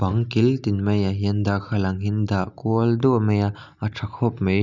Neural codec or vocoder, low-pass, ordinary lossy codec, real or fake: none; 7.2 kHz; none; real